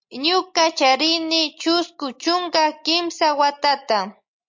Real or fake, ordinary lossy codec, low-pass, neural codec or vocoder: real; MP3, 48 kbps; 7.2 kHz; none